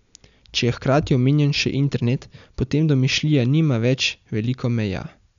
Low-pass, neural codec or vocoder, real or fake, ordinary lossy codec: 7.2 kHz; none; real; none